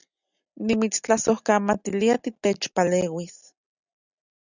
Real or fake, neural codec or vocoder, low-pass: real; none; 7.2 kHz